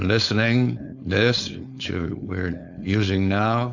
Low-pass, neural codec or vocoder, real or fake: 7.2 kHz; codec, 16 kHz, 4.8 kbps, FACodec; fake